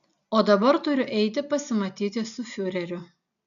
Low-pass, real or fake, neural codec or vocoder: 7.2 kHz; real; none